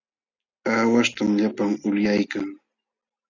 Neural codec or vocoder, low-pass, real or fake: none; 7.2 kHz; real